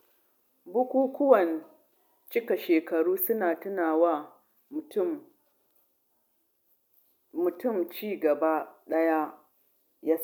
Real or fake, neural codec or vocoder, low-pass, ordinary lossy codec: real; none; 19.8 kHz; none